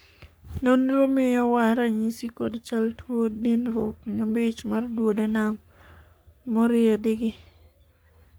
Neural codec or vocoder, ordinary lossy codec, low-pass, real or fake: codec, 44.1 kHz, 3.4 kbps, Pupu-Codec; none; none; fake